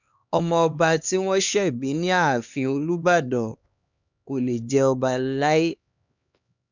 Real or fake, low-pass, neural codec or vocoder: fake; 7.2 kHz; codec, 16 kHz, 2 kbps, X-Codec, HuBERT features, trained on LibriSpeech